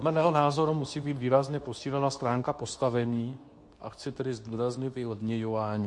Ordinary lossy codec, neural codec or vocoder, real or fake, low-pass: MP3, 48 kbps; codec, 24 kHz, 0.9 kbps, WavTokenizer, medium speech release version 2; fake; 10.8 kHz